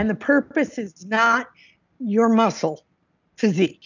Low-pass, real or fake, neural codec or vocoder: 7.2 kHz; real; none